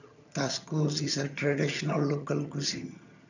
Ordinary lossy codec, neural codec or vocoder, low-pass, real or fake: none; vocoder, 22.05 kHz, 80 mel bands, HiFi-GAN; 7.2 kHz; fake